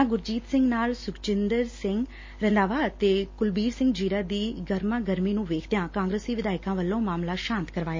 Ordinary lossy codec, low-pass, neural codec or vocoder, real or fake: MP3, 32 kbps; 7.2 kHz; none; real